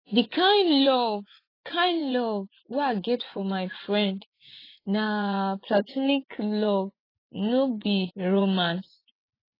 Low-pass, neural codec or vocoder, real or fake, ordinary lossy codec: 5.4 kHz; vocoder, 24 kHz, 100 mel bands, Vocos; fake; AAC, 24 kbps